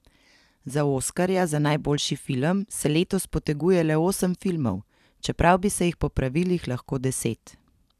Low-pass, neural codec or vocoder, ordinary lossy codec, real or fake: 14.4 kHz; vocoder, 44.1 kHz, 128 mel bands every 256 samples, BigVGAN v2; none; fake